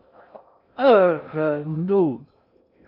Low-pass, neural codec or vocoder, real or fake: 5.4 kHz; codec, 16 kHz in and 24 kHz out, 0.6 kbps, FocalCodec, streaming, 2048 codes; fake